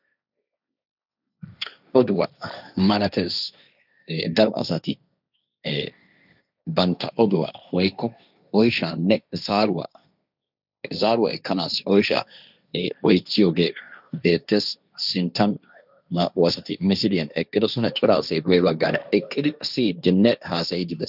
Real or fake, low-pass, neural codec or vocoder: fake; 5.4 kHz; codec, 16 kHz, 1.1 kbps, Voila-Tokenizer